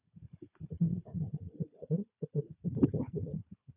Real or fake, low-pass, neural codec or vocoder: fake; 3.6 kHz; codec, 16 kHz, 4 kbps, FunCodec, trained on Chinese and English, 50 frames a second